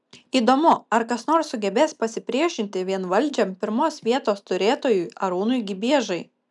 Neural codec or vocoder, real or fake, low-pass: none; real; 10.8 kHz